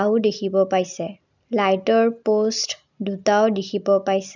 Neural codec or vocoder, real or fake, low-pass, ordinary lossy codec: none; real; 7.2 kHz; none